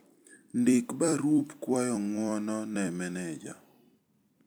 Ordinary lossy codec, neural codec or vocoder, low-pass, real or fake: none; vocoder, 44.1 kHz, 128 mel bands every 256 samples, BigVGAN v2; none; fake